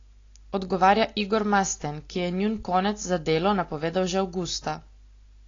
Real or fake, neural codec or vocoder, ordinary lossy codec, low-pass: real; none; AAC, 32 kbps; 7.2 kHz